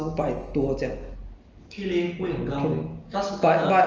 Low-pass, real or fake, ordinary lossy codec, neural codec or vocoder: 7.2 kHz; real; Opus, 24 kbps; none